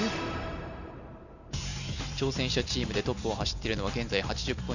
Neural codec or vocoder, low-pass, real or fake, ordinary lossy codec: vocoder, 44.1 kHz, 128 mel bands every 512 samples, BigVGAN v2; 7.2 kHz; fake; none